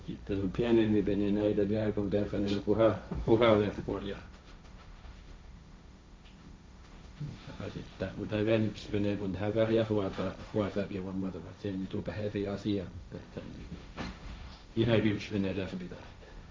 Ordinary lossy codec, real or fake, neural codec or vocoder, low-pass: none; fake; codec, 16 kHz, 1.1 kbps, Voila-Tokenizer; none